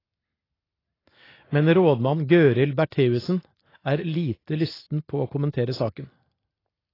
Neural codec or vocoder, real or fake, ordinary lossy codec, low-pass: none; real; AAC, 24 kbps; 5.4 kHz